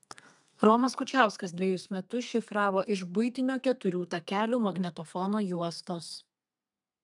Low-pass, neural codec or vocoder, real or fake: 10.8 kHz; codec, 32 kHz, 1.9 kbps, SNAC; fake